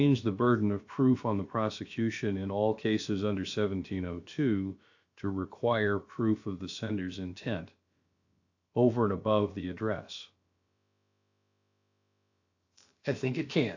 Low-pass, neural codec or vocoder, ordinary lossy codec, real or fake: 7.2 kHz; codec, 16 kHz, about 1 kbps, DyCAST, with the encoder's durations; AAC, 48 kbps; fake